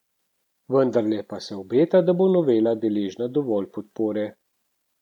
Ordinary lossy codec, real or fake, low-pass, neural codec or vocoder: none; real; 19.8 kHz; none